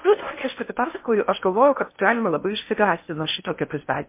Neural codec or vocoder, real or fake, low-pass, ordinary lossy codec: codec, 16 kHz in and 24 kHz out, 0.6 kbps, FocalCodec, streaming, 4096 codes; fake; 3.6 kHz; MP3, 24 kbps